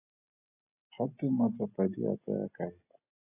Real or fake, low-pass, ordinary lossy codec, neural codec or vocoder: real; 3.6 kHz; AAC, 24 kbps; none